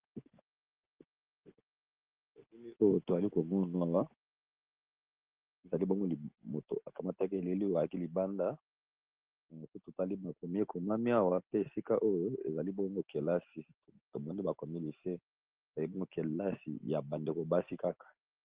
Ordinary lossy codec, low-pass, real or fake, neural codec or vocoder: Opus, 16 kbps; 3.6 kHz; real; none